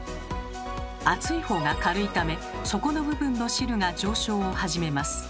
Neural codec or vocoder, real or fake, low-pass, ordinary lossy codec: none; real; none; none